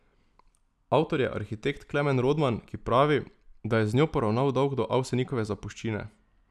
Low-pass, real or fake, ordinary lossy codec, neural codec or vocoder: none; real; none; none